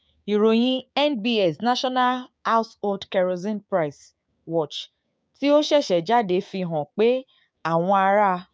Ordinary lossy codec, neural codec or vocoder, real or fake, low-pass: none; codec, 16 kHz, 6 kbps, DAC; fake; none